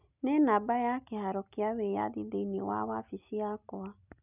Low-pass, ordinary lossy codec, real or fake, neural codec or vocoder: 3.6 kHz; none; real; none